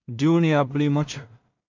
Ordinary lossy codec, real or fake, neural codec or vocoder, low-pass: MP3, 64 kbps; fake; codec, 16 kHz in and 24 kHz out, 0.4 kbps, LongCat-Audio-Codec, two codebook decoder; 7.2 kHz